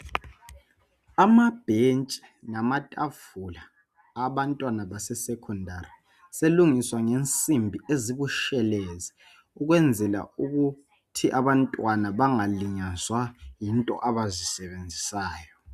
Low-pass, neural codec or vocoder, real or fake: 14.4 kHz; none; real